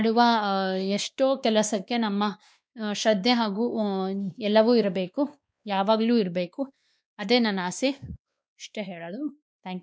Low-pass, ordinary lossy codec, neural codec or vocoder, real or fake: none; none; codec, 16 kHz, 2 kbps, X-Codec, WavLM features, trained on Multilingual LibriSpeech; fake